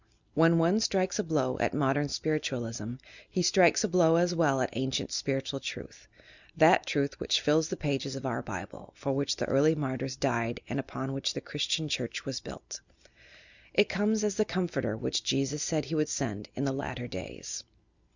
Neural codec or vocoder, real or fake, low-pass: none; real; 7.2 kHz